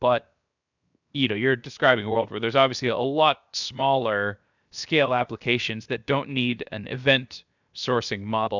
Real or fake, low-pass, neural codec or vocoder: fake; 7.2 kHz; codec, 16 kHz, 0.7 kbps, FocalCodec